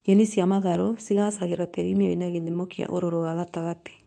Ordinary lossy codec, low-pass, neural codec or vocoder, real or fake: none; none; codec, 24 kHz, 0.9 kbps, WavTokenizer, medium speech release version 2; fake